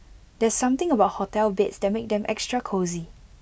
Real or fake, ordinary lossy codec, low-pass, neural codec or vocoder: real; none; none; none